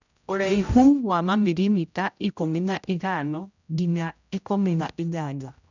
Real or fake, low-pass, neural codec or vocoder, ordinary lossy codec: fake; 7.2 kHz; codec, 16 kHz, 0.5 kbps, X-Codec, HuBERT features, trained on general audio; none